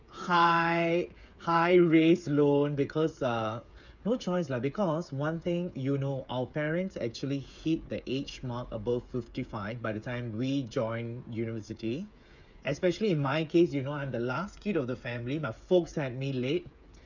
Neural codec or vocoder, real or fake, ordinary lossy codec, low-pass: codec, 16 kHz, 8 kbps, FreqCodec, smaller model; fake; none; 7.2 kHz